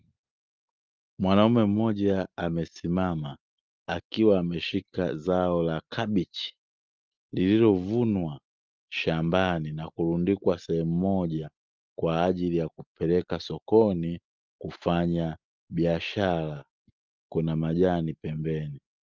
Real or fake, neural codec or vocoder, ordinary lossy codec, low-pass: real; none; Opus, 32 kbps; 7.2 kHz